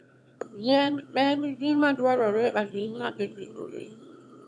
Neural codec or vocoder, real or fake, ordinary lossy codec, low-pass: autoencoder, 22.05 kHz, a latent of 192 numbers a frame, VITS, trained on one speaker; fake; none; none